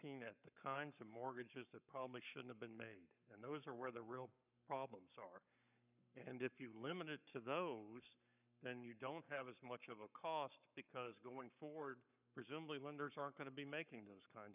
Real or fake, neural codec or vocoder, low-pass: fake; codec, 44.1 kHz, 7.8 kbps, Pupu-Codec; 3.6 kHz